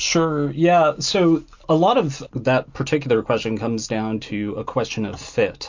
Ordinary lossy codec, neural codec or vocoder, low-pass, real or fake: MP3, 48 kbps; none; 7.2 kHz; real